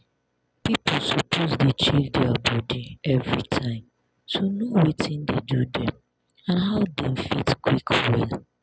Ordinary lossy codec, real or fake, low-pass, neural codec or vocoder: none; real; none; none